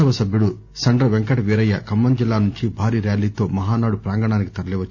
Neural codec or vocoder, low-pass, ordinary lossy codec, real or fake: none; 7.2 kHz; Opus, 64 kbps; real